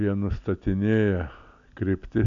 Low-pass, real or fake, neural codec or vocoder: 7.2 kHz; real; none